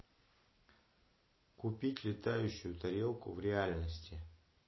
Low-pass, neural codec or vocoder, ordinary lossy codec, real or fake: 7.2 kHz; none; MP3, 24 kbps; real